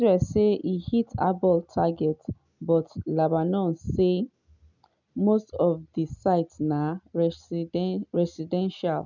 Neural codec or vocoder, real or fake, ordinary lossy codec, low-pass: none; real; none; 7.2 kHz